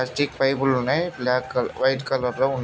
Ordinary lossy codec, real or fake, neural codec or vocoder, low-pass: none; real; none; none